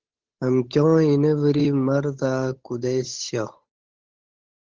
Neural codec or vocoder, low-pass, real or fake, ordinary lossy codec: codec, 16 kHz, 8 kbps, FunCodec, trained on Chinese and English, 25 frames a second; 7.2 kHz; fake; Opus, 16 kbps